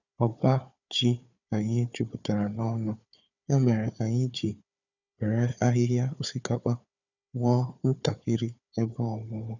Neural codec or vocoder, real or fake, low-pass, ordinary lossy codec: codec, 16 kHz, 4 kbps, FunCodec, trained on Chinese and English, 50 frames a second; fake; 7.2 kHz; none